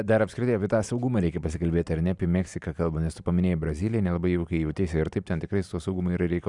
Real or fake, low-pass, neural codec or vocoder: real; 10.8 kHz; none